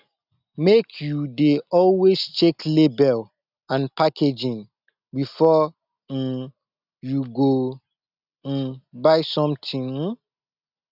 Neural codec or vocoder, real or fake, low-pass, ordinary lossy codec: none; real; 5.4 kHz; none